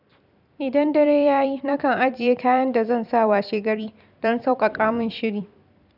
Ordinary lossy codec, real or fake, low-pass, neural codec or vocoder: none; fake; 5.4 kHz; vocoder, 44.1 kHz, 128 mel bands every 256 samples, BigVGAN v2